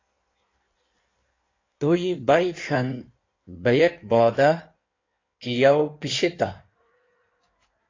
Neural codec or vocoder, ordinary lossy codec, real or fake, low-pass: codec, 16 kHz in and 24 kHz out, 1.1 kbps, FireRedTTS-2 codec; AAC, 32 kbps; fake; 7.2 kHz